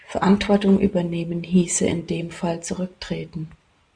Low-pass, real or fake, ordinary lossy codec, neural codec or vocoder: 9.9 kHz; real; MP3, 96 kbps; none